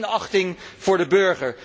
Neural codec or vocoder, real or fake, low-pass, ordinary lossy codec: none; real; none; none